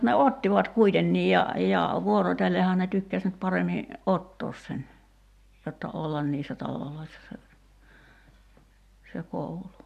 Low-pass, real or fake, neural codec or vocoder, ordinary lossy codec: 14.4 kHz; real; none; AAC, 96 kbps